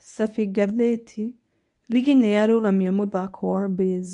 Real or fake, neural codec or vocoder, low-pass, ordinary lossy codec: fake; codec, 24 kHz, 0.9 kbps, WavTokenizer, medium speech release version 1; 10.8 kHz; none